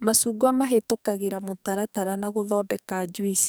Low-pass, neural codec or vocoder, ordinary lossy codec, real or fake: none; codec, 44.1 kHz, 2.6 kbps, SNAC; none; fake